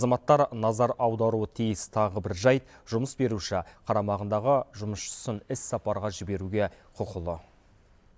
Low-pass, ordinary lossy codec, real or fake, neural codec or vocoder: none; none; real; none